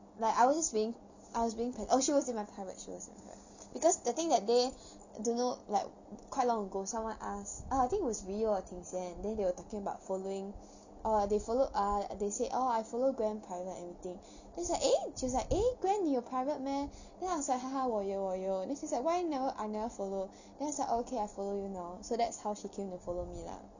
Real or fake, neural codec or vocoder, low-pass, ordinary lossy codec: real; none; 7.2 kHz; none